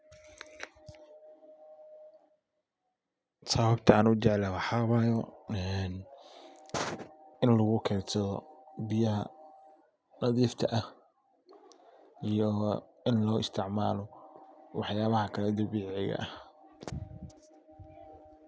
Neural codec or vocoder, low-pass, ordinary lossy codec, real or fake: none; none; none; real